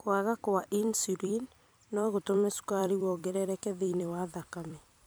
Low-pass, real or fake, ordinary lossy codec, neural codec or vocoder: none; fake; none; vocoder, 44.1 kHz, 128 mel bands every 256 samples, BigVGAN v2